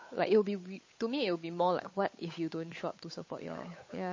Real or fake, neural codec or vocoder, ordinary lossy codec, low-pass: fake; codec, 16 kHz, 8 kbps, FunCodec, trained on Chinese and English, 25 frames a second; MP3, 32 kbps; 7.2 kHz